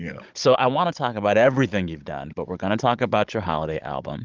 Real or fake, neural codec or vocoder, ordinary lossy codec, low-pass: fake; codec, 16 kHz, 16 kbps, FunCodec, trained on Chinese and English, 50 frames a second; Opus, 24 kbps; 7.2 kHz